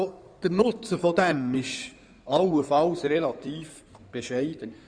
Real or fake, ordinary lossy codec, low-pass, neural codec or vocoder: fake; Opus, 64 kbps; 9.9 kHz; codec, 16 kHz in and 24 kHz out, 2.2 kbps, FireRedTTS-2 codec